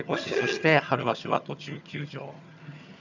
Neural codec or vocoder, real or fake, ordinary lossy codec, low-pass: vocoder, 22.05 kHz, 80 mel bands, HiFi-GAN; fake; none; 7.2 kHz